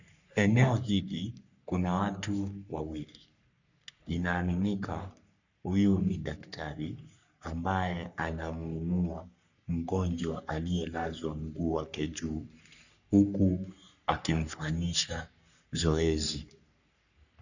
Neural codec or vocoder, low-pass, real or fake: codec, 44.1 kHz, 3.4 kbps, Pupu-Codec; 7.2 kHz; fake